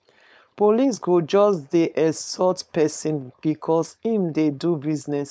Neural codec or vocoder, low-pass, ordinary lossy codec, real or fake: codec, 16 kHz, 4.8 kbps, FACodec; none; none; fake